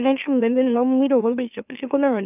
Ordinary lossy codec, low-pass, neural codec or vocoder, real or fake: none; 3.6 kHz; autoencoder, 44.1 kHz, a latent of 192 numbers a frame, MeloTTS; fake